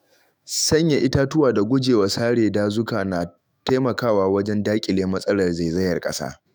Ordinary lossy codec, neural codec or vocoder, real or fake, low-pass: none; autoencoder, 48 kHz, 128 numbers a frame, DAC-VAE, trained on Japanese speech; fake; none